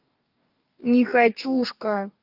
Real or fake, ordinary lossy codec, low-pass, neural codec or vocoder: fake; Opus, 24 kbps; 5.4 kHz; codec, 44.1 kHz, 2.6 kbps, DAC